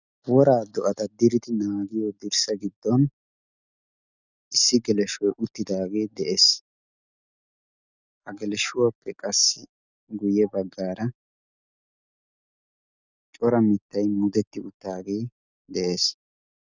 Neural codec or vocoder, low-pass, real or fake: none; 7.2 kHz; real